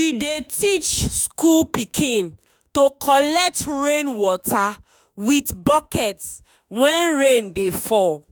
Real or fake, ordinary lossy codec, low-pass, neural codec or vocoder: fake; none; none; autoencoder, 48 kHz, 32 numbers a frame, DAC-VAE, trained on Japanese speech